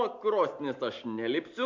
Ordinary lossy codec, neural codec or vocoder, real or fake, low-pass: MP3, 48 kbps; none; real; 7.2 kHz